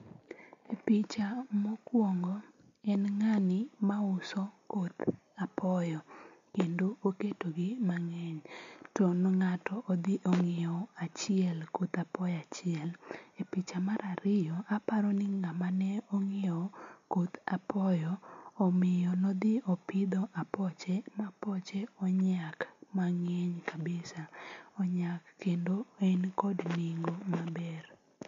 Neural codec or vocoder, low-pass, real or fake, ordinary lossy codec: none; 7.2 kHz; real; MP3, 48 kbps